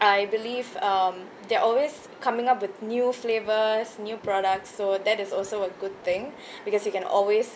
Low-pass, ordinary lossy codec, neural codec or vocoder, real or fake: none; none; none; real